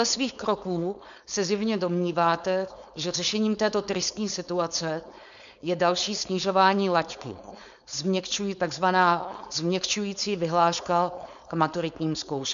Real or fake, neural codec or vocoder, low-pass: fake; codec, 16 kHz, 4.8 kbps, FACodec; 7.2 kHz